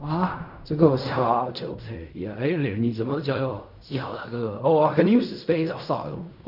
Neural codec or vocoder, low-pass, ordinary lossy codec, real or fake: codec, 16 kHz in and 24 kHz out, 0.4 kbps, LongCat-Audio-Codec, fine tuned four codebook decoder; 5.4 kHz; none; fake